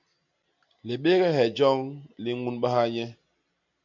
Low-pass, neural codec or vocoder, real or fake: 7.2 kHz; none; real